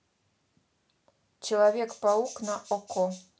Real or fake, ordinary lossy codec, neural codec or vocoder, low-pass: real; none; none; none